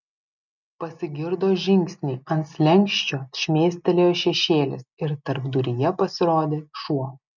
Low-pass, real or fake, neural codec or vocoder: 7.2 kHz; real; none